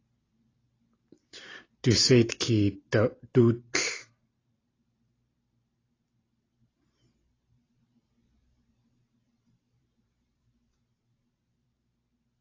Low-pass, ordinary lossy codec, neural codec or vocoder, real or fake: 7.2 kHz; AAC, 32 kbps; vocoder, 44.1 kHz, 128 mel bands every 512 samples, BigVGAN v2; fake